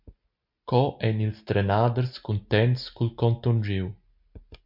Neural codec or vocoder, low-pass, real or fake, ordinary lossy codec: none; 5.4 kHz; real; MP3, 48 kbps